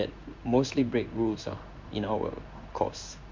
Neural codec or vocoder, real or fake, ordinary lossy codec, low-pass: codec, 16 kHz in and 24 kHz out, 1 kbps, XY-Tokenizer; fake; MP3, 64 kbps; 7.2 kHz